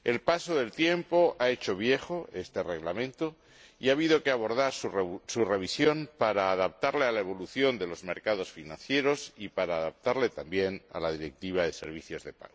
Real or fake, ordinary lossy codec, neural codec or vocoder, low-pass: real; none; none; none